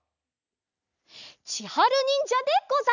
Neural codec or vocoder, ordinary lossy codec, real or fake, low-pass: none; none; real; 7.2 kHz